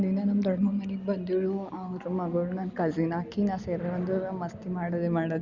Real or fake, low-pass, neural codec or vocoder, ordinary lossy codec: real; 7.2 kHz; none; Opus, 64 kbps